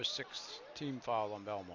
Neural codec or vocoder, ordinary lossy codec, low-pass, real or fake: none; AAC, 48 kbps; 7.2 kHz; real